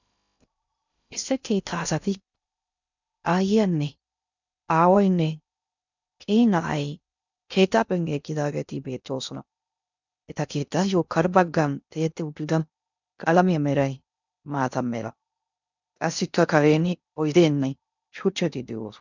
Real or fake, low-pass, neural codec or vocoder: fake; 7.2 kHz; codec, 16 kHz in and 24 kHz out, 0.6 kbps, FocalCodec, streaming, 2048 codes